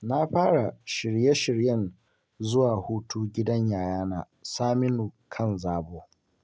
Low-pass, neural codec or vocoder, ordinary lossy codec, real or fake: none; none; none; real